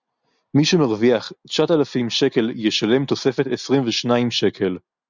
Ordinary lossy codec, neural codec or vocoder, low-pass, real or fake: Opus, 64 kbps; none; 7.2 kHz; real